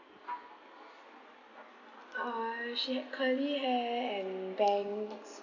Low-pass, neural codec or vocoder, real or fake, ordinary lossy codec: 7.2 kHz; none; real; none